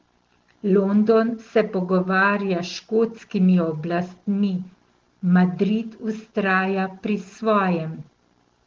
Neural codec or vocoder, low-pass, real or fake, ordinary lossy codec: none; 7.2 kHz; real; Opus, 16 kbps